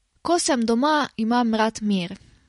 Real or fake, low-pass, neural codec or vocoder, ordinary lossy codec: real; 19.8 kHz; none; MP3, 48 kbps